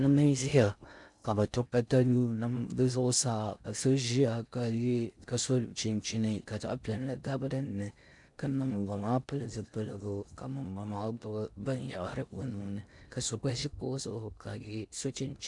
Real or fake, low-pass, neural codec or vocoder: fake; 10.8 kHz; codec, 16 kHz in and 24 kHz out, 0.6 kbps, FocalCodec, streaming, 4096 codes